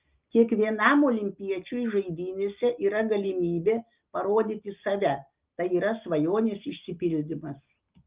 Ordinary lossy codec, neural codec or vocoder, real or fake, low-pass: Opus, 32 kbps; none; real; 3.6 kHz